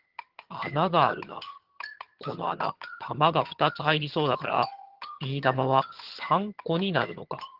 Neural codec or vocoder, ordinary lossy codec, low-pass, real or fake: vocoder, 22.05 kHz, 80 mel bands, HiFi-GAN; Opus, 16 kbps; 5.4 kHz; fake